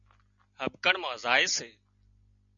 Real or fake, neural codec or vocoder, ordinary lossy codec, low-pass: real; none; MP3, 96 kbps; 7.2 kHz